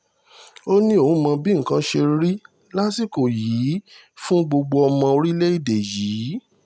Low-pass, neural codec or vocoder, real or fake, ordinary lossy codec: none; none; real; none